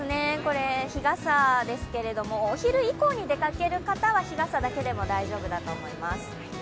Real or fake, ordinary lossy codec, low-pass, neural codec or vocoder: real; none; none; none